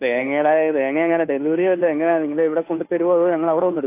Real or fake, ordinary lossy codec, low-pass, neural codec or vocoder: fake; AAC, 24 kbps; 3.6 kHz; codec, 16 kHz, 2 kbps, FunCodec, trained on Chinese and English, 25 frames a second